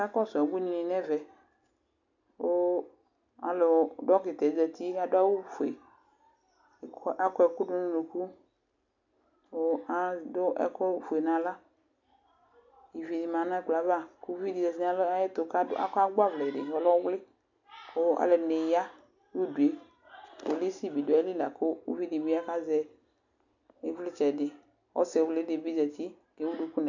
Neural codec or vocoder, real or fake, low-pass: none; real; 7.2 kHz